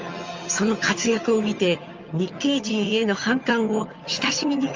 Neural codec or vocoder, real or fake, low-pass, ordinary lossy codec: vocoder, 22.05 kHz, 80 mel bands, HiFi-GAN; fake; 7.2 kHz; Opus, 32 kbps